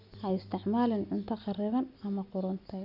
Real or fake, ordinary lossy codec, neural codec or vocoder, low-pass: real; none; none; 5.4 kHz